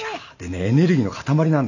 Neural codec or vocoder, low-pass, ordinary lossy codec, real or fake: none; 7.2 kHz; none; real